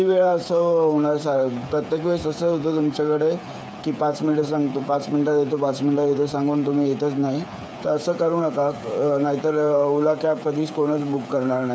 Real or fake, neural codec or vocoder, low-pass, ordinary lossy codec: fake; codec, 16 kHz, 8 kbps, FreqCodec, smaller model; none; none